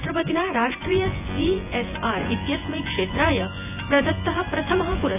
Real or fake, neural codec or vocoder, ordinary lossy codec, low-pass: fake; vocoder, 24 kHz, 100 mel bands, Vocos; none; 3.6 kHz